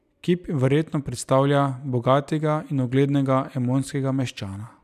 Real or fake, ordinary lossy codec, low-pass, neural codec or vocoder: real; none; 14.4 kHz; none